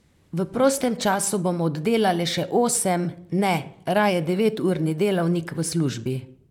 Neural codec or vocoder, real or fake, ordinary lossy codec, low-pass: vocoder, 44.1 kHz, 128 mel bands, Pupu-Vocoder; fake; none; 19.8 kHz